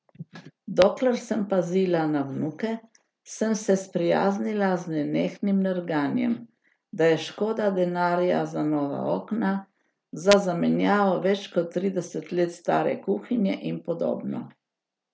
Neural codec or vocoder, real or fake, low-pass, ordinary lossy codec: none; real; none; none